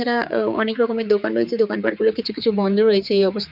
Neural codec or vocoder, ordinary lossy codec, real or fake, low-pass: codec, 16 kHz, 4 kbps, X-Codec, HuBERT features, trained on general audio; none; fake; 5.4 kHz